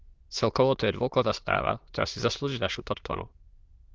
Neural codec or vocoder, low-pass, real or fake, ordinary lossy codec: autoencoder, 22.05 kHz, a latent of 192 numbers a frame, VITS, trained on many speakers; 7.2 kHz; fake; Opus, 16 kbps